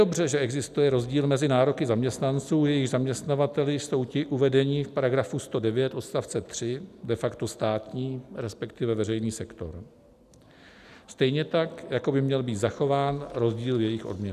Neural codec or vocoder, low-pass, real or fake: none; 14.4 kHz; real